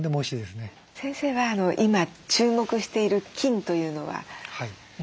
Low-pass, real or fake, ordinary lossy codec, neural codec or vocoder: none; real; none; none